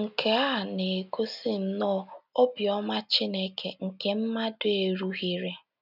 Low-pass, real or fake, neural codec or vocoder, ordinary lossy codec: 5.4 kHz; real; none; none